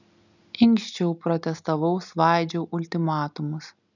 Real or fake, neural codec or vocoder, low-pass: real; none; 7.2 kHz